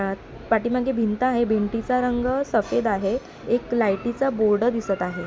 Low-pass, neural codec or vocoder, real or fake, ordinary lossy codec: none; none; real; none